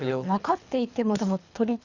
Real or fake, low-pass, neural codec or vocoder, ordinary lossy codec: fake; 7.2 kHz; codec, 24 kHz, 3 kbps, HILCodec; Opus, 64 kbps